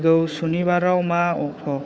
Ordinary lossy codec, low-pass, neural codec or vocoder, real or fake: none; none; codec, 16 kHz, 4 kbps, FunCodec, trained on Chinese and English, 50 frames a second; fake